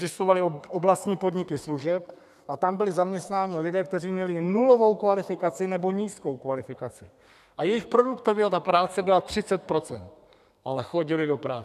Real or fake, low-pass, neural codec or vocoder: fake; 14.4 kHz; codec, 32 kHz, 1.9 kbps, SNAC